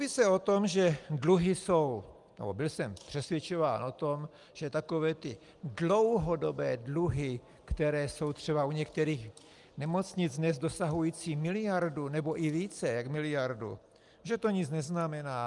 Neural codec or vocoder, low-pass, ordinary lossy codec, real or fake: none; 10.8 kHz; Opus, 32 kbps; real